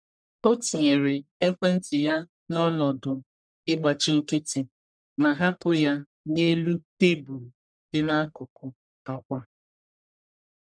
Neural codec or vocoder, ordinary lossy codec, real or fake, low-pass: codec, 44.1 kHz, 1.7 kbps, Pupu-Codec; none; fake; 9.9 kHz